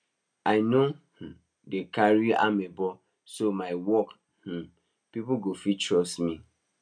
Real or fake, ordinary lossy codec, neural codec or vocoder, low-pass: real; none; none; 9.9 kHz